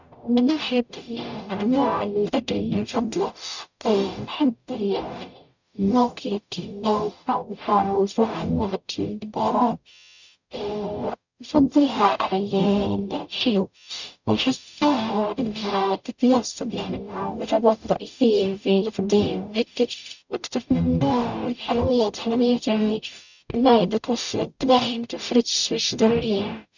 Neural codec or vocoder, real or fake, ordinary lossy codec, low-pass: codec, 44.1 kHz, 0.9 kbps, DAC; fake; none; 7.2 kHz